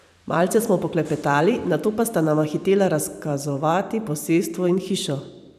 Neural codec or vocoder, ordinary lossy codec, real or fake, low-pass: vocoder, 44.1 kHz, 128 mel bands every 512 samples, BigVGAN v2; none; fake; 14.4 kHz